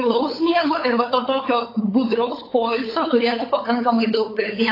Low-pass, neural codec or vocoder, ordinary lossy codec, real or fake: 5.4 kHz; codec, 16 kHz, 8 kbps, FunCodec, trained on LibriTTS, 25 frames a second; AAC, 24 kbps; fake